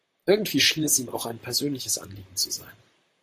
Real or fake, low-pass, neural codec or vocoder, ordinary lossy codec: fake; 14.4 kHz; vocoder, 44.1 kHz, 128 mel bands, Pupu-Vocoder; AAC, 64 kbps